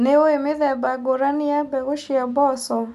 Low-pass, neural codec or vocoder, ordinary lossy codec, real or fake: 14.4 kHz; none; none; real